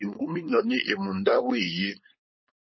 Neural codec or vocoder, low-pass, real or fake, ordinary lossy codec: codec, 16 kHz, 4.8 kbps, FACodec; 7.2 kHz; fake; MP3, 24 kbps